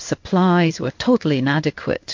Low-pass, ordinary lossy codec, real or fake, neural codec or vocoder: 7.2 kHz; MP3, 48 kbps; fake; codec, 16 kHz in and 24 kHz out, 1 kbps, XY-Tokenizer